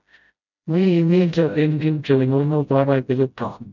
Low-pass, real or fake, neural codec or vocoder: 7.2 kHz; fake; codec, 16 kHz, 0.5 kbps, FreqCodec, smaller model